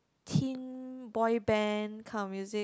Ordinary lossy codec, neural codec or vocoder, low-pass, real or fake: none; none; none; real